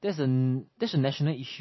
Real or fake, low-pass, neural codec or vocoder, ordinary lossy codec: real; 7.2 kHz; none; MP3, 24 kbps